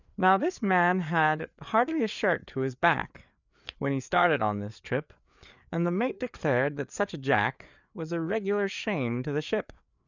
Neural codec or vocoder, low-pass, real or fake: codec, 16 kHz, 4 kbps, FreqCodec, larger model; 7.2 kHz; fake